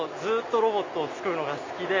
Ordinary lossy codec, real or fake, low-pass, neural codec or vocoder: MP3, 64 kbps; real; 7.2 kHz; none